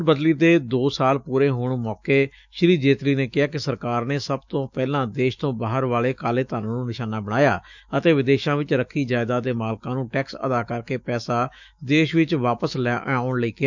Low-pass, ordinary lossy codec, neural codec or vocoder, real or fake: 7.2 kHz; none; autoencoder, 48 kHz, 128 numbers a frame, DAC-VAE, trained on Japanese speech; fake